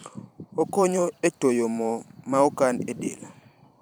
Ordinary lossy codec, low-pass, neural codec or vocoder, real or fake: none; none; vocoder, 44.1 kHz, 128 mel bands every 512 samples, BigVGAN v2; fake